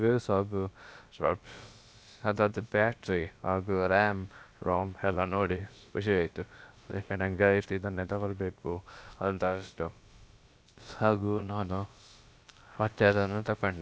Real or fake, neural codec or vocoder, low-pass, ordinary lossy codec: fake; codec, 16 kHz, about 1 kbps, DyCAST, with the encoder's durations; none; none